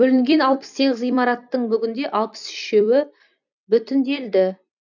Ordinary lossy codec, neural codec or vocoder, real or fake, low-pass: none; vocoder, 44.1 kHz, 128 mel bands every 256 samples, BigVGAN v2; fake; 7.2 kHz